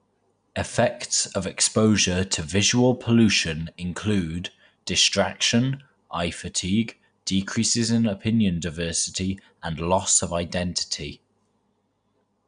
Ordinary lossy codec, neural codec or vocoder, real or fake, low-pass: none; none; real; 9.9 kHz